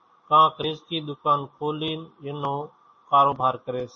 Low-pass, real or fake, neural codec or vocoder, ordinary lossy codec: 7.2 kHz; real; none; MP3, 32 kbps